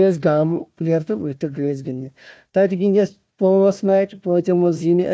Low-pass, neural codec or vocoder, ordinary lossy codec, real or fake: none; codec, 16 kHz, 1 kbps, FunCodec, trained on Chinese and English, 50 frames a second; none; fake